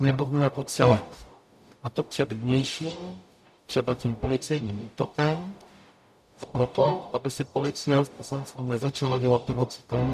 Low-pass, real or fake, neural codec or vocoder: 14.4 kHz; fake; codec, 44.1 kHz, 0.9 kbps, DAC